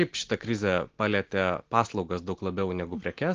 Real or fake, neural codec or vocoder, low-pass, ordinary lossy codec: real; none; 7.2 kHz; Opus, 16 kbps